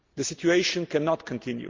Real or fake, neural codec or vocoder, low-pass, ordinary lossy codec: real; none; 7.2 kHz; Opus, 32 kbps